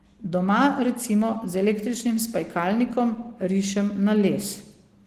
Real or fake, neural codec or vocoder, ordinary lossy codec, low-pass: real; none; Opus, 16 kbps; 14.4 kHz